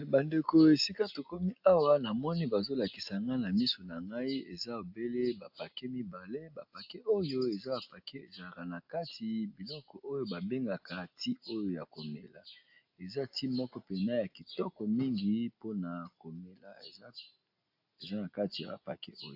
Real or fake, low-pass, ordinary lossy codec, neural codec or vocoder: real; 5.4 kHz; AAC, 48 kbps; none